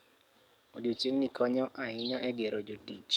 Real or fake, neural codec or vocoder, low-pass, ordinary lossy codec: fake; codec, 44.1 kHz, 7.8 kbps, DAC; 19.8 kHz; none